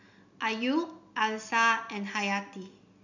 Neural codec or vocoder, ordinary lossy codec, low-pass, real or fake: none; none; 7.2 kHz; real